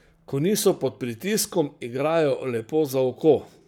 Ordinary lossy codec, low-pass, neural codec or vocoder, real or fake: none; none; codec, 44.1 kHz, 7.8 kbps, Pupu-Codec; fake